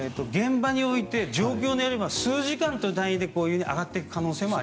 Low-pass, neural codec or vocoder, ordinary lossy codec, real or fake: none; none; none; real